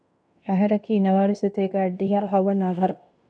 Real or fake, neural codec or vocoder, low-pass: fake; codec, 16 kHz in and 24 kHz out, 0.9 kbps, LongCat-Audio-Codec, fine tuned four codebook decoder; 9.9 kHz